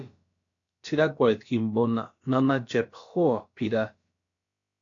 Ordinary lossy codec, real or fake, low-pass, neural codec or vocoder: AAC, 48 kbps; fake; 7.2 kHz; codec, 16 kHz, about 1 kbps, DyCAST, with the encoder's durations